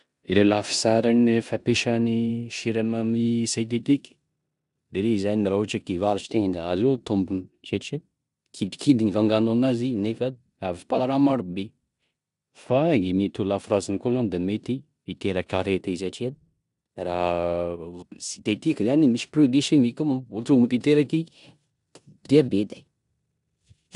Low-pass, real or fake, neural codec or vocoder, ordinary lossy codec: 10.8 kHz; fake; codec, 16 kHz in and 24 kHz out, 0.9 kbps, LongCat-Audio-Codec, four codebook decoder; none